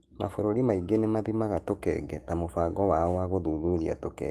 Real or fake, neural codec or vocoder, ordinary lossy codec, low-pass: fake; autoencoder, 48 kHz, 128 numbers a frame, DAC-VAE, trained on Japanese speech; Opus, 24 kbps; 14.4 kHz